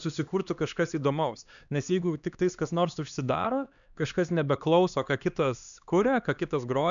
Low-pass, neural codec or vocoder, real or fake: 7.2 kHz; codec, 16 kHz, 2 kbps, X-Codec, HuBERT features, trained on LibriSpeech; fake